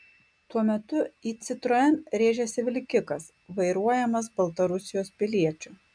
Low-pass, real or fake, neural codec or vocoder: 9.9 kHz; real; none